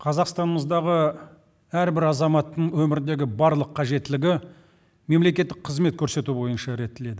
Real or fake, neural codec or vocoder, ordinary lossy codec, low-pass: real; none; none; none